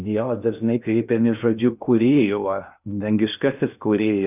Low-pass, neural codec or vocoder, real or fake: 3.6 kHz; codec, 16 kHz in and 24 kHz out, 0.6 kbps, FocalCodec, streaming, 2048 codes; fake